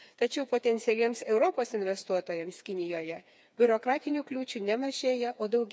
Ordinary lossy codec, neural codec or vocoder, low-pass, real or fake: none; codec, 16 kHz, 4 kbps, FreqCodec, smaller model; none; fake